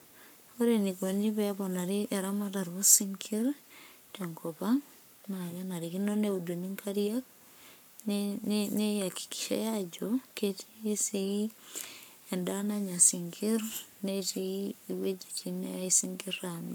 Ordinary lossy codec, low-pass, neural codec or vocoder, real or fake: none; none; codec, 44.1 kHz, 7.8 kbps, Pupu-Codec; fake